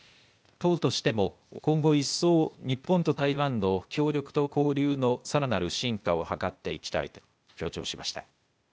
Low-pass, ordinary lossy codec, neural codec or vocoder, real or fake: none; none; codec, 16 kHz, 0.8 kbps, ZipCodec; fake